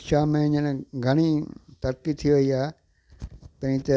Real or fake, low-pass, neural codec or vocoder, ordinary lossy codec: real; none; none; none